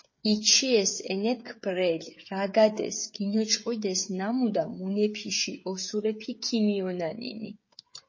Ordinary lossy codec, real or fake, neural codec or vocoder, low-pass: MP3, 32 kbps; fake; codec, 16 kHz, 16 kbps, FreqCodec, smaller model; 7.2 kHz